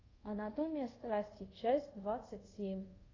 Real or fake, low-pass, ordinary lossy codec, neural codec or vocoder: fake; 7.2 kHz; AAC, 32 kbps; codec, 24 kHz, 0.5 kbps, DualCodec